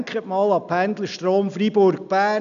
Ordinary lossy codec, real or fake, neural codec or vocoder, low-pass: none; real; none; 7.2 kHz